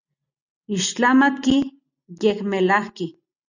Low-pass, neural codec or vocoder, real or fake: 7.2 kHz; none; real